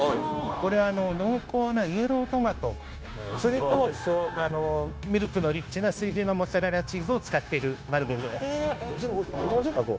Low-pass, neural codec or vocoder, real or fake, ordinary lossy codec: none; codec, 16 kHz, 0.9 kbps, LongCat-Audio-Codec; fake; none